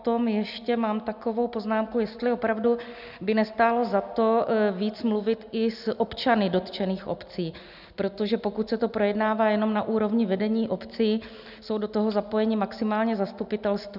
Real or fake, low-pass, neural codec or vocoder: real; 5.4 kHz; none